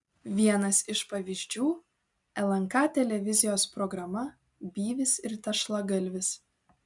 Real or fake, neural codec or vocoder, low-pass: real; none; 10.8 kHz